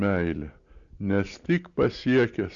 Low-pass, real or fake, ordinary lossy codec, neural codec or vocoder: 7.2 kHz; real; Opus, 64 kbps; none